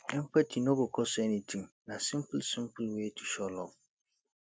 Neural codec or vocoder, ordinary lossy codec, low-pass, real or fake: none; none; none; real